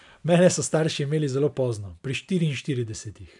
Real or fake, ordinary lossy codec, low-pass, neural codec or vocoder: real; none; 10.8 kHz; none